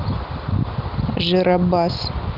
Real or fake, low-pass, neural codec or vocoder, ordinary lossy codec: real; 5.4 kHz; none; Opus, 32 kbps